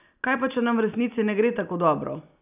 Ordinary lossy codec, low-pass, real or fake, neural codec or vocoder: none; 3.6 kHz; real; none